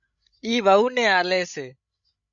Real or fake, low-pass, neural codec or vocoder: fake; 7.2 kHz; codec, 16 kHz, 16 kbps, FreqCodec, larger model